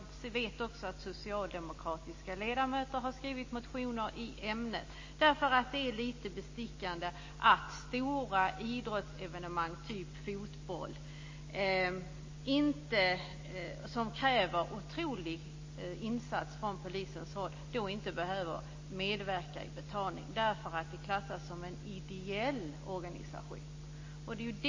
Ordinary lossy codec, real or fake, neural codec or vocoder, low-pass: MP3, 32 kbps; real; none; 7.2 kHz